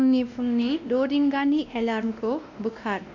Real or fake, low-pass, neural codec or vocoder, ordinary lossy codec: fake; 7.2 kHz; codec, 16 kHz, 1 kbps, X-Codec, WavLM features, trained on Multilingual LibriSpeech; none